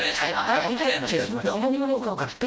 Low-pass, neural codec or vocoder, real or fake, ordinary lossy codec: none; codec, 16 kHz, 0.5 kbps, FreqCodec, smaller model; fake; none